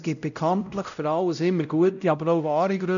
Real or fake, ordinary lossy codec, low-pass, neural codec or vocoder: fake; none; 7.2 kHz; codec, 16 kHz, 0.5 kbps, X-Codec, WavLM features, trained on Multilingual LibriSpeech